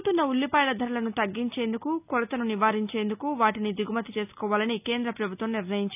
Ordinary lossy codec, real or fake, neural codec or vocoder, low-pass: none; real; none; 3.6 kHz